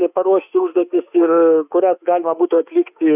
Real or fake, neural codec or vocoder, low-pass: fake; autoencoder, 48 kHz, 32 numbers a frame, DAC-VAE, trained on Japanese speech; 3.6 kHz